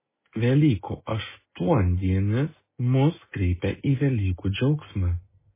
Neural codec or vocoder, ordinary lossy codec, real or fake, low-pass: none; MP3, 16 kbps; real; 3.6 kHz